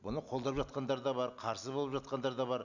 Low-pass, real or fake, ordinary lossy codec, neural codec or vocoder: 7.2 kHz; real; none; none